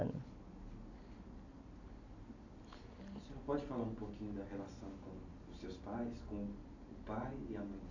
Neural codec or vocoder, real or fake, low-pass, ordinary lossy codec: none; real; 7.2 kHz; AAC, 48 kbps